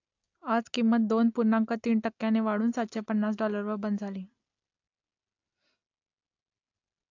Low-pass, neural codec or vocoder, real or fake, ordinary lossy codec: 7.2 kHz; none; real; AAC, 48 kbps